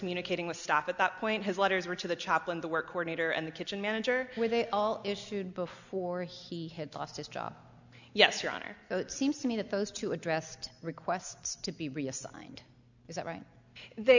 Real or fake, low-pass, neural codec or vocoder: real; 7.2 kHz; none